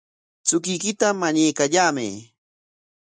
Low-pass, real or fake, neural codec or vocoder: 9.9 kHz; real; none